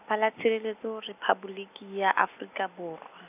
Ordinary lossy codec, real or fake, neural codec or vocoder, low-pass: none; real; none; 3.6 kHz